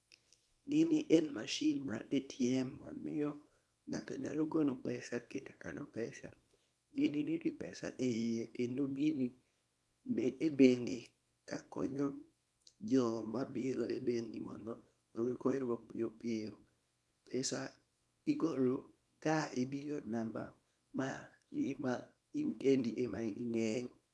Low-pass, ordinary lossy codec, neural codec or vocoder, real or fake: none; none; codec, 24 kHz, 0.9 kbps, WavTokenizer, small release; fake